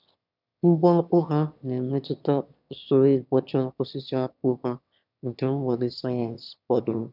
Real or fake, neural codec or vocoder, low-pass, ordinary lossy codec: fake; autoencoder, 22.05 kHz, a latent of 192 numbers a frame, VITS, trained on one speaker; 5.4 kHz; none